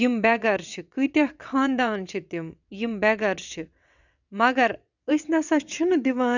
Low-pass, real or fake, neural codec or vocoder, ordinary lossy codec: 7.2 kHz; fake; vocoder, 22.05 kHz, 80 mel bands, WaveNeXt; none